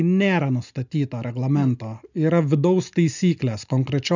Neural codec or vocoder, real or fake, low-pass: none; real; 7.2 kHz